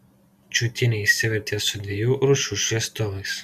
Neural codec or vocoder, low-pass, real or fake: none; 14.4 kHz; real